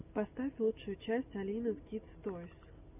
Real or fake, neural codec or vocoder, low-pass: real; none; 3.6 kHz